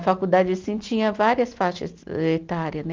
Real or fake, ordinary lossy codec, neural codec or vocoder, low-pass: real; Opus, 24 kbps; none; 7.2 kHz